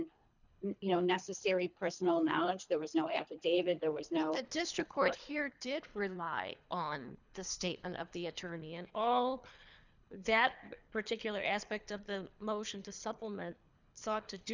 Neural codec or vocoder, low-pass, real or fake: codec, 24 kHz, 3 kbps, HILCodec; 7.2 kHz; fake